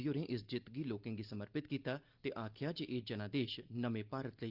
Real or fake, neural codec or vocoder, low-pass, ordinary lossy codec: real; none; 5.4 kHz; Opus, 24 kbps